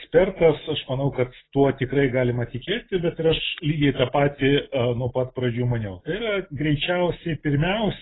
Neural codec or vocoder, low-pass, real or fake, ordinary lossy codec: none; 7.2 kHz; real; AAC, 16 kbps